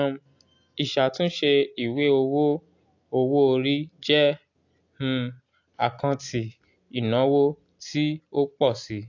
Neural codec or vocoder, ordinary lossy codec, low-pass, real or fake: none; AAC, 48 kbps; 7.2 kHz; real